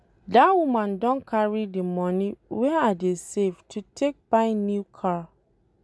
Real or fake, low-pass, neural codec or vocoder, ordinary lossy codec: real; none; none; none